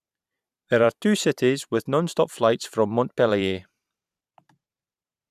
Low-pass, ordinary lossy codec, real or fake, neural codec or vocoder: 14.4 kHz; none; fake; vocoder, 44.1 kHz, 128 mel bands every 512 samples, BigVGAN v2